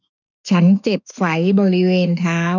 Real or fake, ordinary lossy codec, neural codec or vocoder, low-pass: fake; none; autoencoder, 48 kHz, 32 numbers a frame, DAC-VAE, trained on Japanese speech; 7.2 kHz